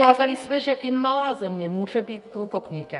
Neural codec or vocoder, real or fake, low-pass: codec, 24 kHz, 0.9 kbps, WavTokenizer, medium music audio release; fake; 10.8 kHz